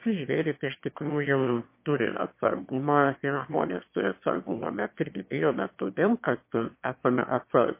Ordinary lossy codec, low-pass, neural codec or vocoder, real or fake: MP3, 24 kbps; 3.6 kHz; autoencoder, 22.05 kHz, a latent of 192 numbers a frame, VITS, trained on one speaker; fake